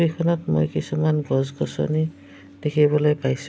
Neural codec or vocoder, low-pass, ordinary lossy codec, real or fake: none; none; none; real